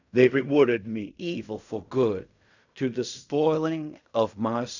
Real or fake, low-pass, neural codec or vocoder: fake; 7.2 kHz; codec, 16 kHz in and 24 kHz out, 0.4 kbps, LongCat-Audio-Codec, fine tuned four codebook decoder